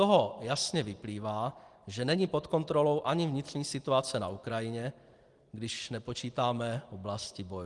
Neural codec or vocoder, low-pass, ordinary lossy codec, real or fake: none; 10.8 kHz; Opus, 24 kbps; real